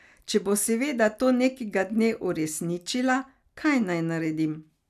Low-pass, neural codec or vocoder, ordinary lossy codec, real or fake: 14.4 kHz; none; none; real